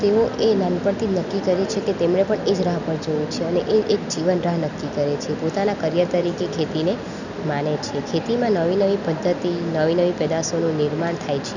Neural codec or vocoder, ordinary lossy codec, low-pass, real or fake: none; none; 7.2 kHz; real